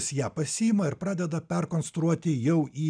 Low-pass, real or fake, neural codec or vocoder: 9.9 kHz; real; none